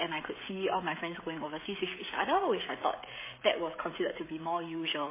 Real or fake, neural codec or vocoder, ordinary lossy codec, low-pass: fake; codec, 16 kHz, 16 kbps, FreqCodec, smaller model; MP3, 16 kbps; 3.6 kHz